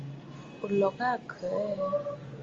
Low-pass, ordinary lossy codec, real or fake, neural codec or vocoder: 7.2 kHz; Opus, 32 kbps; real; none